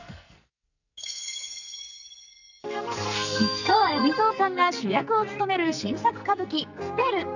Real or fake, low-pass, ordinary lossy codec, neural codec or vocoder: fake; 7.2 kHz; none; codec, 44.1 kHz, 2.6 kbps, SNAC